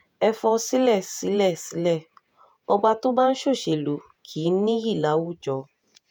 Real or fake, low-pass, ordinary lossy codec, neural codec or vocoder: fake; none; none; vocoder, 48 kHz, 128 mel bands, Vocos